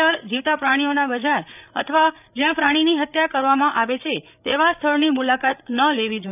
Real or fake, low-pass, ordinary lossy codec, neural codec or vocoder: fake; 3.6 kHz; none; codec, 16 kHz, 8 kbps, FreqCodec, larger model